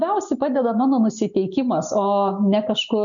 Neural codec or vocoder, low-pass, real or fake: none; 7.2 kHz; real